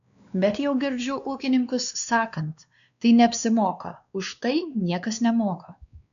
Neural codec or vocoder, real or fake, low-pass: codec, 16 kHz, 2 kbps, X-Codec, WavLM features, trained on Multilingual LibriSpeech; fake; 7.2 kHz